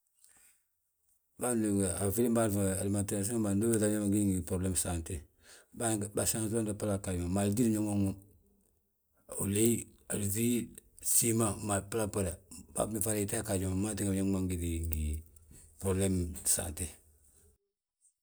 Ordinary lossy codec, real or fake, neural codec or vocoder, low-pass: none; real; none; none